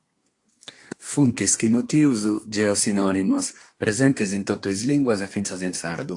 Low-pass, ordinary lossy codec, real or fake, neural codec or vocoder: 10.8 kHz; AAC, 48 kbps; fake; codec, 24 kHz, 1 kbps, SNAC